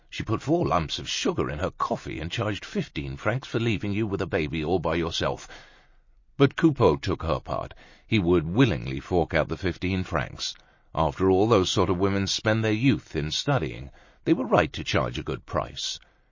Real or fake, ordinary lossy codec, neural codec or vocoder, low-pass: real; MP3, 32 kbps; none; 7.2 kHz